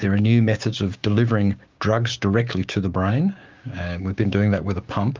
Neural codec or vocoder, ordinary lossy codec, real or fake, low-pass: codec, 16 kHz, 6 kbps, DAC; Opus, 24 kbps; fake; 7.2 kHz